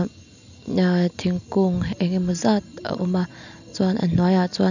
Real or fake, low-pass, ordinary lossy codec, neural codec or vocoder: real; 7.2 kHz; none; none